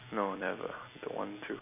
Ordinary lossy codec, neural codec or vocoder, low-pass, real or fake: none; vocoder, 44.1 kHz, 128 mel bands every 256 samples, BigVGAN v2; 3.6 kHz; fake